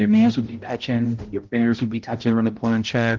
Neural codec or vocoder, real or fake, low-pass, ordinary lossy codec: codec, 16 kHz, 0.5 kbps, X-Codec, HuBERT features, trained on balanced general audio; fake; 7.2 kHz; Opus, 16 kbps